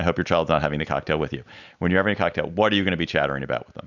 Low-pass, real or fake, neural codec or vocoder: 7.2 kHz; real; none